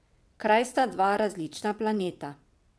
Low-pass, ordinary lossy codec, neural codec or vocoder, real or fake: none; none; vocoder, 22.05 kHz, 80 mel bands, WaveNeXt; fake